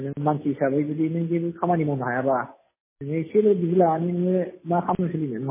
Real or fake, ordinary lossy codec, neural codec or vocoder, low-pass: real; MP3, 16 kbps; none; 3.6 kHz